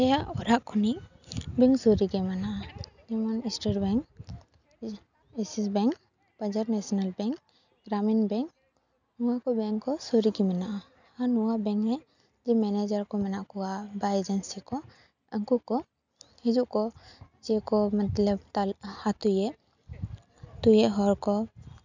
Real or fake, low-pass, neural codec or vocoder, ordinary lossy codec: real; 7.2 kHz; none; none